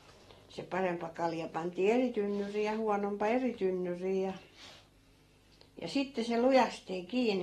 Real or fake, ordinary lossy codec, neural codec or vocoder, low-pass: real; AAC, 32 kbps; none; 19.8 kHz